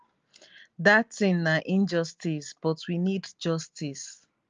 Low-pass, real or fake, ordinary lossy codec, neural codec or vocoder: 7.2 kHz; real; Opus, 32 kbps; none